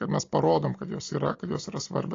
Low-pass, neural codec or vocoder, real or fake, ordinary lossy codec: 7.2 kHz; none; real; AAC, 32 kbps